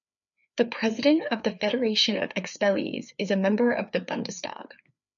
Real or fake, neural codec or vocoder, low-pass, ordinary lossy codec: fake; codec, 16 kHz, 4 kbps, FreqCodec, larger model; 7.2 kHz; MP3, 96 kbps